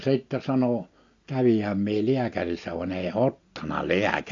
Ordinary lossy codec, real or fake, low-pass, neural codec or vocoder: AAC, 48 kbps; real; 7.2 kHz; none